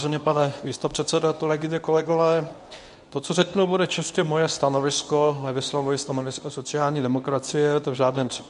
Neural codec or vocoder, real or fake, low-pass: codec, 24 kHz, 0.9 kbps, WavTokenizer, medium speech release version 1; fake; 10.8 kHz